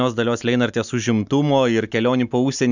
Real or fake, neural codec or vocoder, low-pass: real; none; 7.2 kHz